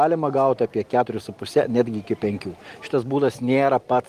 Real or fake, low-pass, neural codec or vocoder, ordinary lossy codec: real; 14.4 kHz; none; Opus, 24 kbps